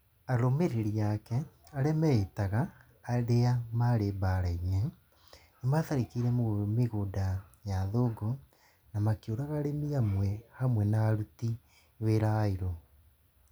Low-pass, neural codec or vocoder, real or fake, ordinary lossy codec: none; none; real; none